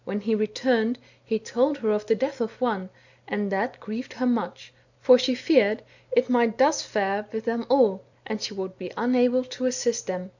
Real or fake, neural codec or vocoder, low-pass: real; none; 7.2 kHz